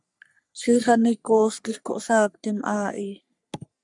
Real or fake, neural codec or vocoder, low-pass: fake; codec, 44.1 kHz, 3.4 kbps, Pupu-Codec; 10.8 kHz